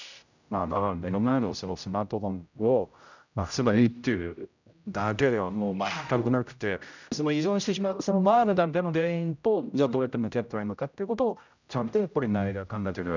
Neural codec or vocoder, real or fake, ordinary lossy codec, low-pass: codec, 16 kHz, 0.5 kbps, X-Codec, HuBERT features, trained on general audio; fake; none; 7.2 kHz